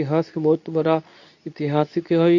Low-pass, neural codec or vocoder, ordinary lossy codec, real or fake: 7.2 kHz; codec, 24 kHz, 0.9 kbps, WavTokenizer, medium speech release version 2; none; fake